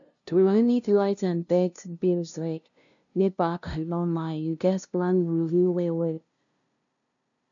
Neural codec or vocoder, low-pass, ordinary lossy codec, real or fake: codec, 16 kHz, 0.5 kbps, FunCodec, trained on LibriTTS, 25 frames a second; 7.2 kHz; none; fake